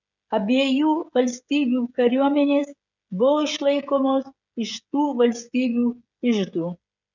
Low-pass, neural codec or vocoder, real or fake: 7.2 kHz; codec, 16 kHz, 16 kbps, FreqCodec, smaller model; fake